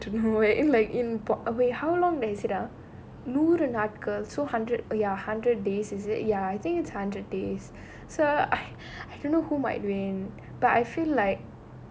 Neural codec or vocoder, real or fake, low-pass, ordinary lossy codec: none; real; none; none